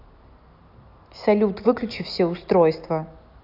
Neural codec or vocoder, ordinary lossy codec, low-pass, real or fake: none; none; 5.4 kHz; real